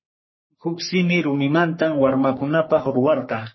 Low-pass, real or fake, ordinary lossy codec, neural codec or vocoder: 7.2 kHz; fake; MP3, 24 kbps; codec, 44.1 kHz, 3.4 kbps, Pupu-Codec